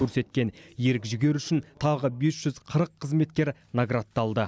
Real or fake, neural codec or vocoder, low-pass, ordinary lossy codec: real; none; none; none